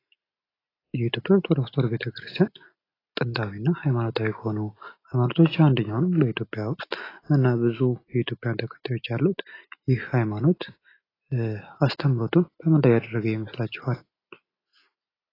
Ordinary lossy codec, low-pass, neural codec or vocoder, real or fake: AAC, 24 kbps; 5.4 kHz; none; real